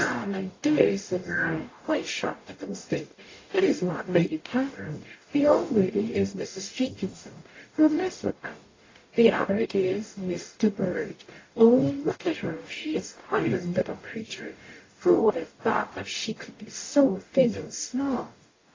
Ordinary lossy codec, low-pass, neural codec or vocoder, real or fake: AAC, 32 kbps; 7.2 kHz; codec, 44.1 kHz, 0.9 kbps, DAC; fake